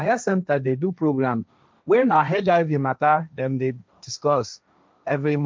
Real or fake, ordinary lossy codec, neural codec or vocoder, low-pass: fake; none; codec, 16 kHz, 1.1 kbps, Voila-Tokenizer; none